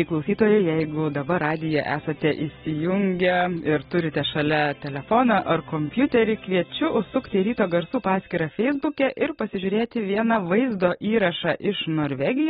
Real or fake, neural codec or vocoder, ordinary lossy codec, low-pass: real; none; AAC, 16 kbps; 14.4 kHz